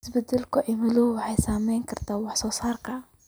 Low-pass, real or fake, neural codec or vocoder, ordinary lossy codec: none; real; none; none